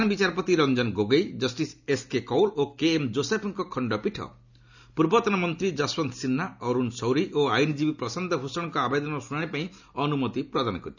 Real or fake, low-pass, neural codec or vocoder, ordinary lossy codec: real; 7.2 kHz; none; none